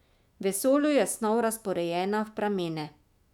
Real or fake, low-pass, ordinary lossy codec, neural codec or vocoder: fake; 19.8 kHz; none; autoencoder, 48 kHz, 128 numbers a frame, DAC-VAE, trained on Japanese speech